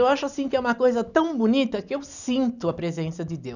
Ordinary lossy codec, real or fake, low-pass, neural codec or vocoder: none; real; 7.2 kHz; none